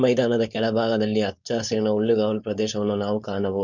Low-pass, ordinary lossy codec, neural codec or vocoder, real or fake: 7.2 kHz; none; codec, 16 kHz, 4.8 kbps, FACodec; fake